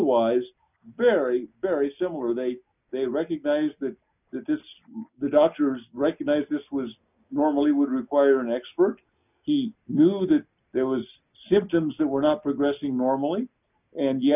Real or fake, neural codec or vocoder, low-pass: real; none; 3.6 kHz